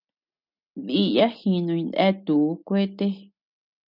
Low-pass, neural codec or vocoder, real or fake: 5.4 kHz; none; real